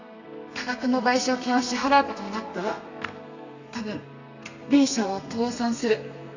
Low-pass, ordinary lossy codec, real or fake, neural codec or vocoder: 7.2 kHz; none; fake; codec, 32 kHz, 1.9 kbps, SNAC